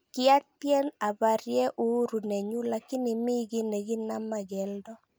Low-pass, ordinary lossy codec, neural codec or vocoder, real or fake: none; none; none; real